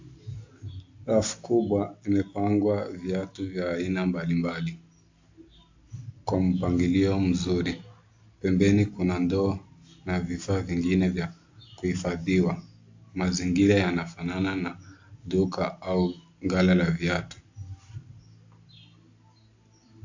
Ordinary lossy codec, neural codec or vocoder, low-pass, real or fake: AAC, 48 kbps; none; 7.2 kHz; real